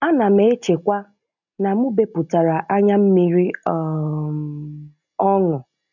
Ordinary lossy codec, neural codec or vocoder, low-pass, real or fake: none; none; 7.2 kHz; real